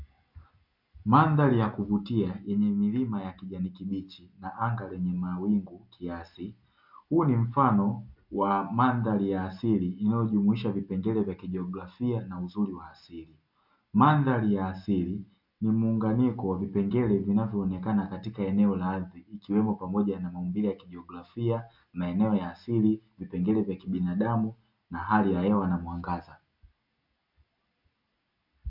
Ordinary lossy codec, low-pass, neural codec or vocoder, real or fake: MP3, 48 kbps; 5.4 kHz; none; real